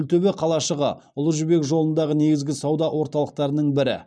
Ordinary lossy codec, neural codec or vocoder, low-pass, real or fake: none; none; none; real